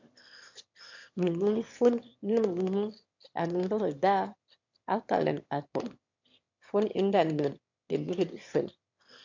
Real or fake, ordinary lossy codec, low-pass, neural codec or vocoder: fake; MP3, 48 kbps; 7.2 kHz; autoencoder, 22.05 kHz, a latent of 192 numbers a frame, VITS, trained on one speaker